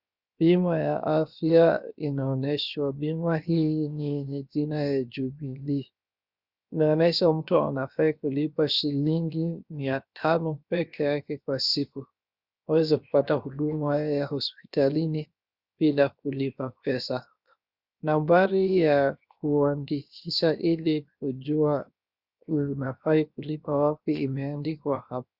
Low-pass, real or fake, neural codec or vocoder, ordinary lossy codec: 5.4 kHz; fake; codec, 16 kHz, 0.7 kbps, FocalCodec; Opus, 64 kbps